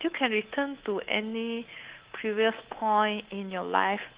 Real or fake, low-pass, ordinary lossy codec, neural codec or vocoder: fake; 3.6 kHz; Opus, 16 kbps; codec, 24 kHz, 3.1 kbps, DualCodec